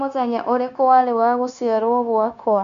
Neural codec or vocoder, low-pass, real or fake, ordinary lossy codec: codec, 16 kHz, 0.9 kbps, LongCat-Audio-Codec; 7.2 kHz; fake; none